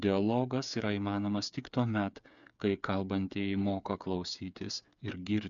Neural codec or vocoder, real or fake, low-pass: codec, 16 kHz, 8 kbps, FreqCodec, smaller model; fake; 7.2 kHz